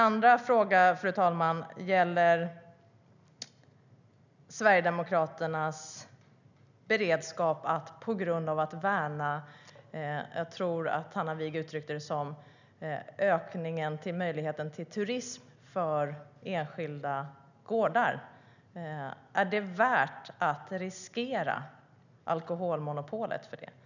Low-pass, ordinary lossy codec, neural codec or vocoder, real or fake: 7.2 kHz; none; none; real